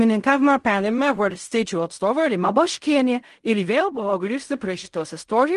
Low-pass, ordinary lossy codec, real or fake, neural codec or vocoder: 10.8 kHz; Opus, 24 kbps; fake; codec, 16 kHz in and 24 kHz out, 0.4 kbps, LongCat-Audio-Codec, fine tuned four codebook decoder